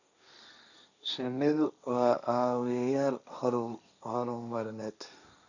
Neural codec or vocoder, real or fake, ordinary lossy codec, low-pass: codec, 16 kHz, 1.1 kbps, Voila-Tokenizer; fake; none; 7.2 kHz